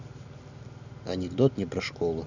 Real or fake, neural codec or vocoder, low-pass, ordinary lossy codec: real; none; 7.2 kHz; none